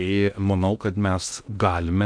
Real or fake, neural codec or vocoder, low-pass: fake; codec, 16 kHz in and 24 kHz out, 0.6 kbps, FocalCodec, streaming, 2048 codes; 9.9 kHz